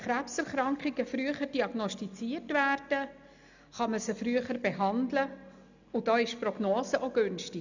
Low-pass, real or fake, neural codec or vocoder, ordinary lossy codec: 7.2 kHz; real; none; none